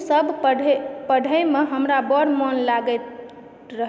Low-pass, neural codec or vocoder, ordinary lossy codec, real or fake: none; none; none; real